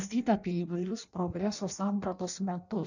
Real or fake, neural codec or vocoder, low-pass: fake; codec, 16 kHz in and 24 kHz out, 0.6 kbps, FireRedTTS-2 codec; 7.2 kHz